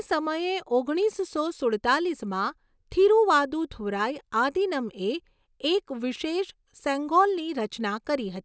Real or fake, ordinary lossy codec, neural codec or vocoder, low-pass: real; none; none; none